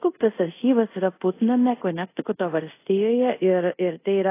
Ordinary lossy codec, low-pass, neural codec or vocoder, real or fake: AAC, 24 kbps; 3.6 kHz; codec, 24 kHz, 0.5 kbps, DualCodec; fake